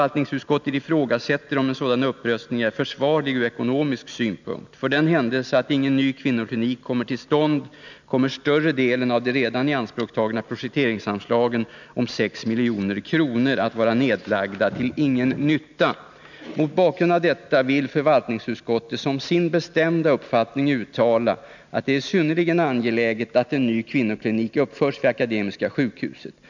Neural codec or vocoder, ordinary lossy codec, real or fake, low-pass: none; none; real; 7.2 kHz